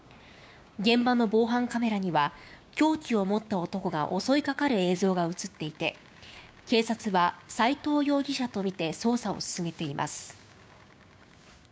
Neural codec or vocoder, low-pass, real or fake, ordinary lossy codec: codec, 16 kHz, 6 kbps, DAC; none; fake; none